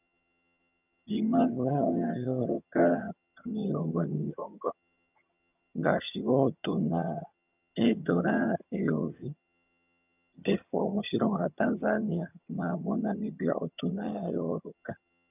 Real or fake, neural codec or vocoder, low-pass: fake; vocoder, 22.05 kHz, 80 mel bands, HiFi-GAN; 3.6 kHz